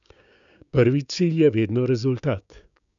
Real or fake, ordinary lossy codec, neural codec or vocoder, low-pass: fake; MP3, 96 kbps; codec, 16 kHz, 6 kbps, DAC; 7.2 kHz